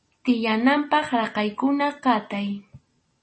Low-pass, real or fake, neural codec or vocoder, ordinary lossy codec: 9.9 kHz; real; none; MP3, 32 kbps